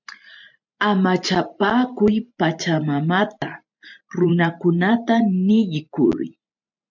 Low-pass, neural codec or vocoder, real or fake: 7.2 kHz; vocoder, 44.1 kHz, 128 mel bands every 512 samples, BigVGAN v2; fake